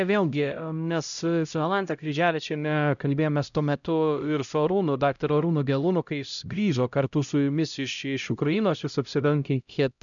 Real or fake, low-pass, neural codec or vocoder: fake; 7.2 kHz; codec, 16 kHz, 0.5 kbps, X-Codec, HuBERT features, trained on LibriSpeech